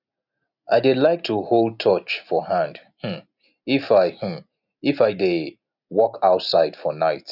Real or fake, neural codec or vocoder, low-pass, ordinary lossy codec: real; none; 5.4 kHz; none